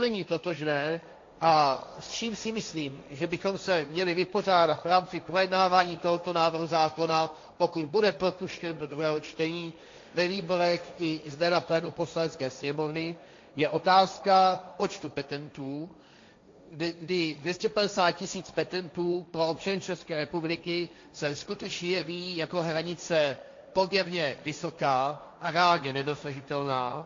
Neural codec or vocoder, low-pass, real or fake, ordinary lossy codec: codec, 16 kHz, 1.1 kbps, Voila-Tokenizer; 7.2 kHz; fake; AAC, 48 kbps